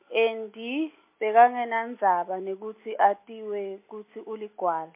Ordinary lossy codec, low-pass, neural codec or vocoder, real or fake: MP3, 24 kbps; 3.6 kHz; none; real